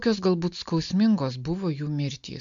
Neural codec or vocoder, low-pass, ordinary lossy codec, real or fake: none; 7.2 kHz; MP3, 64 kbps; real